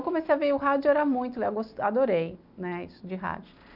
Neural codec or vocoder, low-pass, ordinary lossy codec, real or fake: none; 5.4 kHz; none; real